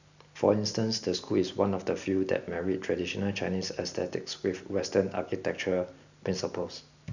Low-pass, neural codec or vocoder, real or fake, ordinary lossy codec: 7.2 kHz; none; real; none